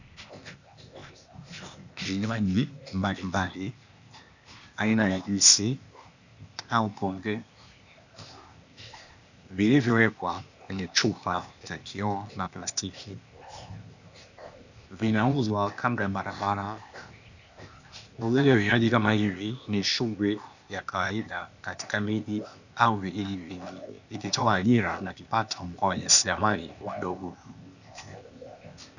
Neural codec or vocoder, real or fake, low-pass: codec, 16 kHz, 0.8 kbps, ZipCodec; fake; 7.2 kHz